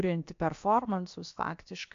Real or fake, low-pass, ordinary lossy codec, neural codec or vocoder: fake; 7.2 kHz; AAC, 48 kbps; codec, 16 kHz, about 1 kbps, DyCAST, with the encoder's durations